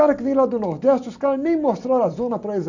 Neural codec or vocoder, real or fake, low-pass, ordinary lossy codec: none; real; 7.2 kHz; none